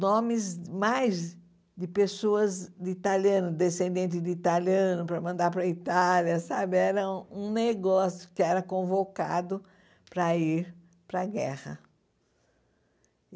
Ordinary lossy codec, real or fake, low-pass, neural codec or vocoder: none; real; none; none